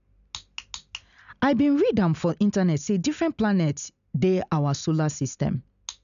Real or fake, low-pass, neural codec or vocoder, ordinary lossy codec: real; 7.2 kHz; none; none